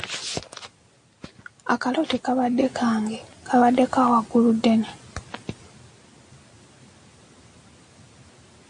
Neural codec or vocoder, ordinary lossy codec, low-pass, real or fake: none; AAC, 64 kbps; 9.9 kHz; real